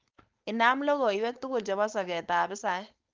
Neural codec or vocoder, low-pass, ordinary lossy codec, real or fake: codec, 16 kHz, 4.8 kbps, FACodec; 7.2 kHz; Opus, 24 kbps; fake